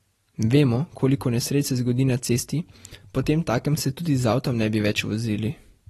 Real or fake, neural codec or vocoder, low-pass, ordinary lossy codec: real; none; 19.8 kHz; AAC, 32 kbps